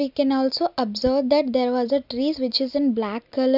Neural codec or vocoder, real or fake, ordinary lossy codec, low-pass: none; real; none; 5.4 kHz